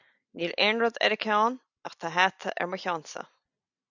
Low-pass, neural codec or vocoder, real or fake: 7.2 kHz; none; real